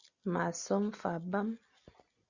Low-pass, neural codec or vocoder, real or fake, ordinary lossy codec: 7.2 kHz; none; real; Opus, 64 kbps